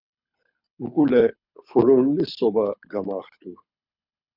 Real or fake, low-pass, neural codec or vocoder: fake; 5.4 kHz; codec, 24 kHz, 6 kbps, HILCodec